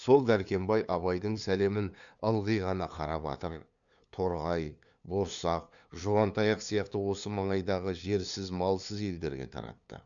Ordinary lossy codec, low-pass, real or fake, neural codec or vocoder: none; 7.2 kHz; fake; codec, 16 kHz, 2 kbps, FunCodec, trained on LibriTTS, 25 frames a second